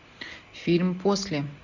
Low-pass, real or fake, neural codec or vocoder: 7.2 kHz; real; none